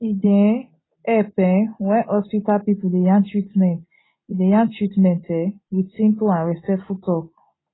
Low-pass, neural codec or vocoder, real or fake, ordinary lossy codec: 7.2 kHz; none; real; AAC, 16 kbps